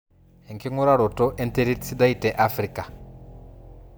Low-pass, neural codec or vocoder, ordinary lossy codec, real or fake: none; none; none; real